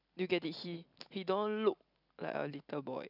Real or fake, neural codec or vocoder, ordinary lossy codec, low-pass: real; none; none; 5.4 kHz